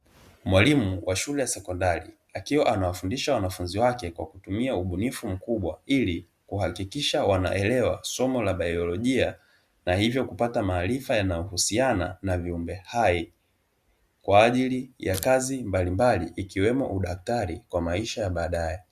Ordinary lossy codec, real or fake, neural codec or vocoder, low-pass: Opus, 64 kbps; real; none; 14.4 kHz